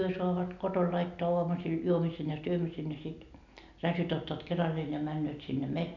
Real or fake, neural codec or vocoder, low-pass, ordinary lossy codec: real; none; 7.2 kHz; none